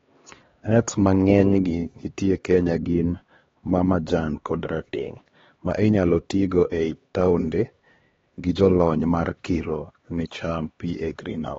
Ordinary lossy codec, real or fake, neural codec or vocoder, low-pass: AAC, 24 kbps; fake; codec, 16 kHz, 2 kbps, X-Codec, HuBERT features, trained on LibriSpeech; 7.2 kHz